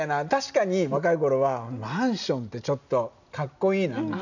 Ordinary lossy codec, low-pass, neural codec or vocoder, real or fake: none; 7.2 kHz; none; real